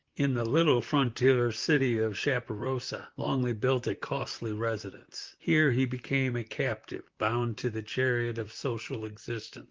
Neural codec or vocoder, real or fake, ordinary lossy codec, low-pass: vocoder, 44.1 kHz, 128 mel bands, Pupu-Vocoder; fake; Opus, 32 kbps; 7.2 kHz